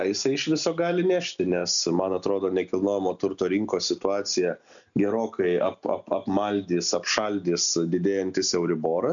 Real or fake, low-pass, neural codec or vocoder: real; 7.2 kHz; none